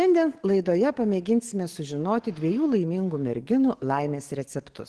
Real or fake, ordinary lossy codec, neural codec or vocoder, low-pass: fake; Opus, 16 kbps; codec, 44.1 kHz, 7.8 kbps, DAC; 10.8 kHz